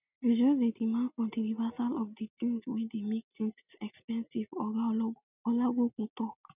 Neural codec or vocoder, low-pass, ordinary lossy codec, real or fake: none; 3.6 kHz; none; real